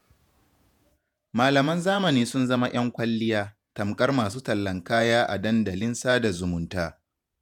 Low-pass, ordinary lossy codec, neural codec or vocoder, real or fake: 19.8 kHz; none; vocoder, 48 kHz, 128 mel bands, Vocos; fake